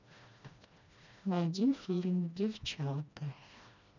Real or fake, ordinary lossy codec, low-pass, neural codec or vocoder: fake; none; 7.2 kHz; codec, 16 kHz, 1 kbps, FreqCodec, smaller model